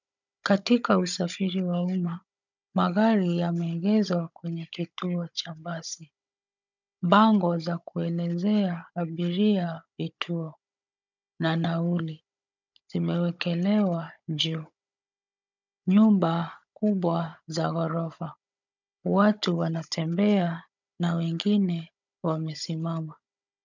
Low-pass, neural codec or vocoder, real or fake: 7.2 kHz; codec, 16 kHz, 16 kbps, FunCodec, trained on Chinese and English, 50 frames a second; fake